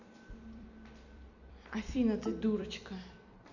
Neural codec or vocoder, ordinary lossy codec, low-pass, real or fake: vocoder, 44.1 kHz, 128 mel bands every 256 samples, BigVGAN v2; none; 7.2 kHz; fake